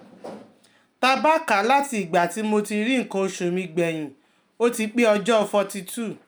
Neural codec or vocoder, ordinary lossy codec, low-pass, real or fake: none; none; none; real